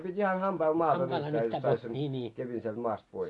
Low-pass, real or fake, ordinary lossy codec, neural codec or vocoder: 10.8 kHz; real; AAC, 48 kbps; none